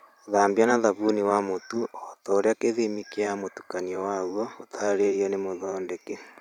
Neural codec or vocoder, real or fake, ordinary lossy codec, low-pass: vocoder, 44.1 kHz, 128 mel bands every 512 samples, BigVGAN v2; fake; none; 19.8 kHz